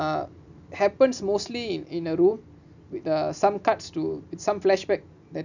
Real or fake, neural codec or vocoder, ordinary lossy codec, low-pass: real; none; none; 7.2 kHz